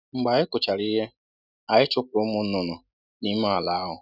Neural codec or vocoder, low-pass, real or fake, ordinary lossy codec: none; 5.4 kHz; real; none